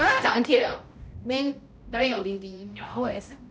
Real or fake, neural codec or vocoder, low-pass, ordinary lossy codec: fake; codec, 16 kHz, 0.5 kbps, X-Codec, HuBERT features, trained on balanced general audio; none; none